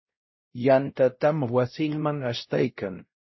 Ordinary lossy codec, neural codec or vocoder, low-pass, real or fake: MP3, 24 kbps; codec, 16 kHz, 0.5 kbps, X-Codec, WavLM features, trained on Multilingual LibriSpeech; 7.2 kHz; fake